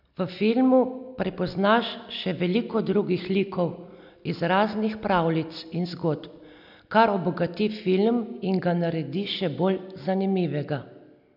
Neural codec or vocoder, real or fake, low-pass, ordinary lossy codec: none; real; 5.4 kHz; none